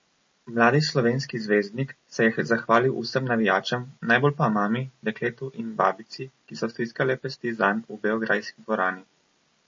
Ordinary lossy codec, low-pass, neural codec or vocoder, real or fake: MP3, 32 kbps; 7.2 kHz; none; real